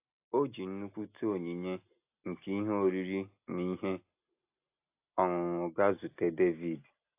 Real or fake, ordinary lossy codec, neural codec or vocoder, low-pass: real; AAC, 32 kbps; none; 3.6 kHz